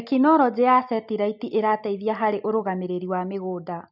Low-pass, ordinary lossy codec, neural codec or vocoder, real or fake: 5.4 kHz; none; none; real